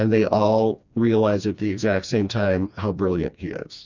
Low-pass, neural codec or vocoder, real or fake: 7.2 kHz; codec, 16 kHz, 2 kbps, FreqCodec, smaller model; fake